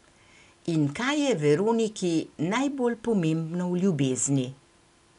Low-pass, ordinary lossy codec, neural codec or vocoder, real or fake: 10.8 kHz; none; none; real